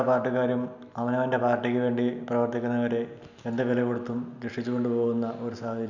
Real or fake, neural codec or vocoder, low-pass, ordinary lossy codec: real; none; 7.2 kHz; none